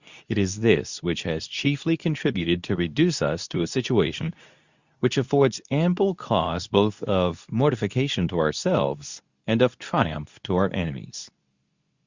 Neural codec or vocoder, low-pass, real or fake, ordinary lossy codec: codec, 24 kHz, 0.9 kbps, WavTokenizer, medium speech release version 2; 7.2 kHz; fake; Opus, 64 kbps